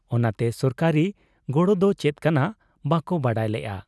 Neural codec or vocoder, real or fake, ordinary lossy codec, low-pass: none; real; none; none